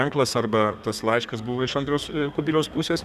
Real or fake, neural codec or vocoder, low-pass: fake; codec, 32 kHz, 1.9 kbps, SNAC; 14.4 kHz